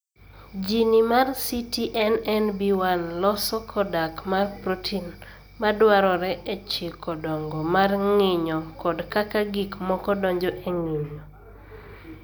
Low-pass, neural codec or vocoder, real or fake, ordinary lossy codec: none; none; real; none